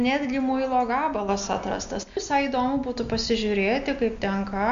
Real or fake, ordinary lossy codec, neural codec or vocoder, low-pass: real; MP3, 64 kbps; none; 7.2 kHz